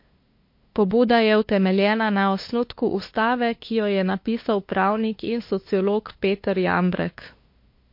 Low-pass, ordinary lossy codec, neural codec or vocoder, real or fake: 5.4 kHz; MP3, 32 kbps; codec, 16 kHz, 2 kbps, FunCodec, trained on LibriTTS, 25 frames a second; fake